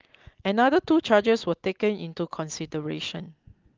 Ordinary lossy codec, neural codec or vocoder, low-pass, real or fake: Opus, 32 kbps; none; 7.2 kHz; real